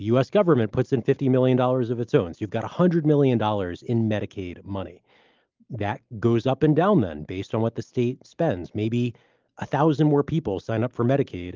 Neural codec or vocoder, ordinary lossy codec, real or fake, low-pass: none; Opus, 32 kbps; real; 7.2 kHz